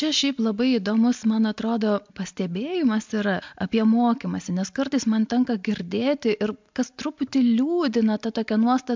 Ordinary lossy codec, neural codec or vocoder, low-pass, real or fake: MP3, 64 kbps; none; 7.2 kHz; real